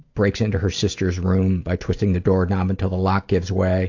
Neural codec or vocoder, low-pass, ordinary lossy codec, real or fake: none; 7.2 kHz; AAC, 48 kbps; real